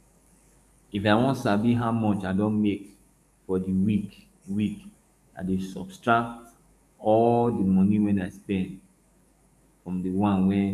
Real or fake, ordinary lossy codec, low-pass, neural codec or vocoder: fake; none; 14.4 kHz; codec, 44.1 kHz, 7.8 kbps, DAC